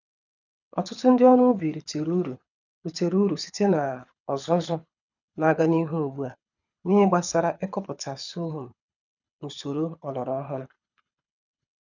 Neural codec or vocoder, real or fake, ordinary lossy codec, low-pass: codec, 24 kHz, 6 kbps, HILCodec; fake; none; 7.2 kHz